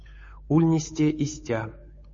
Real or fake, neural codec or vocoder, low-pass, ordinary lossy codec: fake; codec, 16 kHz, 8 kbps, FunCodec, trained on Chinese and English, 25 frames a second; 7.2 kHz; MP3, 32 kbps